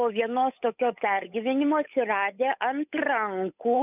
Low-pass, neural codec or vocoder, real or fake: 3.6 kHz; codec, 16 kHz, 8 kbps, FunCodec, trained on Chinese and English, 25 frames a second; fake